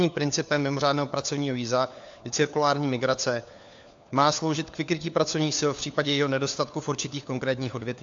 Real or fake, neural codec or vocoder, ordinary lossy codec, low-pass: fake; codec, 16 kHz, 4 kbps, FunCodec, trained on LibriTTS, 50 frames a second; AAC, 64 kbps; 7.2 kHz